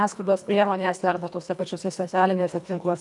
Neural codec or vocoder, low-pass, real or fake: codec, 24 kHz, 1.5 kbps, HILCodec; 10.8 kHz; fake